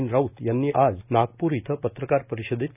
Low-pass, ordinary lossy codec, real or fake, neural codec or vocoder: 3.6 kHz; none; real; none